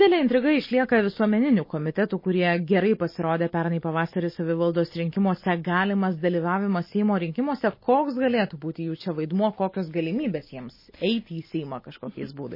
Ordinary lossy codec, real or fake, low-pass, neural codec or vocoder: MP3, 24 kbps; real; 5.4 kHz; none